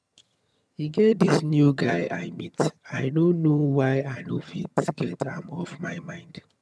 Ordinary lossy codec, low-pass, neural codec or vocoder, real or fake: none; none; vocoder, 22.05 kHz, 80 mel bands, HiFi-GAN; fake